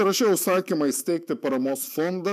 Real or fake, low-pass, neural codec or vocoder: fake; 14.4 kHz; autoencoder, 48 kHz, 128 numbers a frame, DAC-VAE, trained on Japanese speech